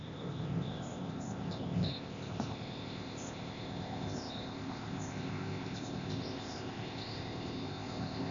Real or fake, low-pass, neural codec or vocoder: fake; 7.2 kHz; codec, 16 kHz, 0.8 kbps, ZipCodec